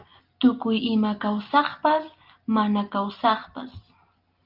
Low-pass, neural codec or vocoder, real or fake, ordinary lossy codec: 5.4 kHz; none; real; Opus, 24 kbps